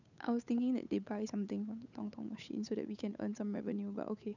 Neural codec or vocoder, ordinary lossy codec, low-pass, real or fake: codec, 16 kHz, 8 kbps, FunCodec, trained on Chinese and English, 25 frames a second; none; 7.2 kHz; fake